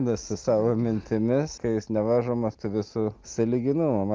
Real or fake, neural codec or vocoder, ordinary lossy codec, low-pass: real; none; Opus, 32 kbps; 7.2 kHz